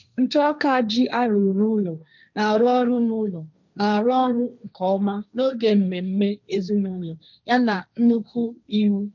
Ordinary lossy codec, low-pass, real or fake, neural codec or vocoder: none; 7.2 kHz; fake; codec, 16 kHz, 1.1 kbps, Voila-Tokenizer